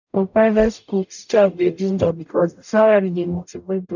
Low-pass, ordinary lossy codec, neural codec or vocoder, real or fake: 7.2 kHz; none; codec, 44.1 kHz, 0.9 kbps, DAC; fake